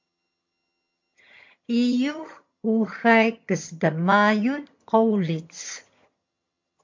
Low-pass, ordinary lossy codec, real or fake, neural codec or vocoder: 7.2 kHz; MP3, 48 kbps; fake; vocoder, 22.05 kHz, 80 mel bands, HiFi-GAN